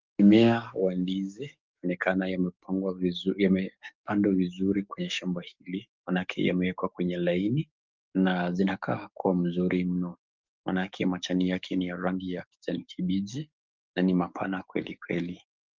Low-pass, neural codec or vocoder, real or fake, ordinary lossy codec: 7.2 kHz; codec, 44.1 kHz, 7.8 kbps, DAC; fake; Opus, 24 kbps